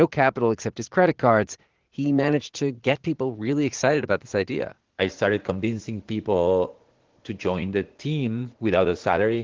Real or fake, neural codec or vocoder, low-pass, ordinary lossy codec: fake; vocoder, 44.1 kHz, 80 mel bands, Vocos; 7.2 kHz; Opus, 16 kbps